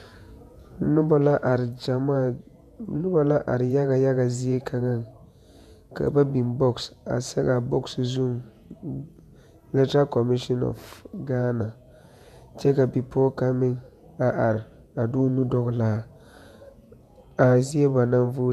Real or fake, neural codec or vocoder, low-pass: fake; vocoder, 48 kHz, 128 mel bands, Vocos; 14.4 kHz